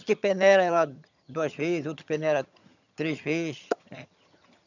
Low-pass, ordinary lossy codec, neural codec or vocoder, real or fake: 7.2 kHz; none; vocoder, 22.05 kHz, 80 mel bands, HiFi-GAN; fake